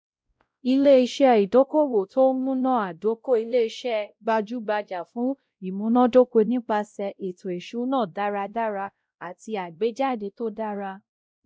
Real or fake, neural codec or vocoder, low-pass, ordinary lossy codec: fake; codec, 16 kHz, 0.5 kbps, X-Codec, WavLM features, trained on Multilingual LibriSpeech; none; none